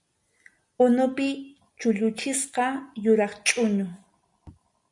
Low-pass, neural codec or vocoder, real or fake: 10.8 kHz; none; real